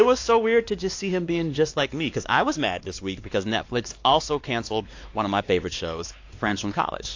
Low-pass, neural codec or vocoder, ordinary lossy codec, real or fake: 7.2 kHz; codec, 16 kHz, 2 kbps, X-Codec, WavLM features, trained on Multilingual LibriSpeech; AAC, 48 kbps; fake